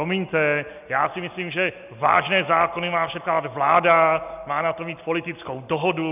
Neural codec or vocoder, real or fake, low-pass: none; real; 3.6 kHz